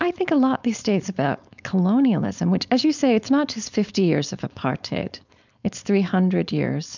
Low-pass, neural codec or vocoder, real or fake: 7.2 kHz; codec, 16 kHz, 4.8 kbps, FACodec; fake